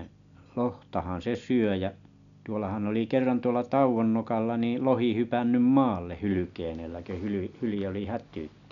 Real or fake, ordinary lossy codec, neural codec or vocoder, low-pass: real; none; none; 7.2 kHz